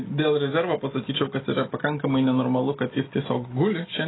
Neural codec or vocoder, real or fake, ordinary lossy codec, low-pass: none; real; AAC, 16 kbps; 7.2 kHz